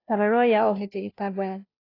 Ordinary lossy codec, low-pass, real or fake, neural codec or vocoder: AAC, 24 kbps; 5.4 kHz; fake; codec, 16 kHz, 0.5 kbps, FunCodec, trained on Chinese and English, 25 frames a second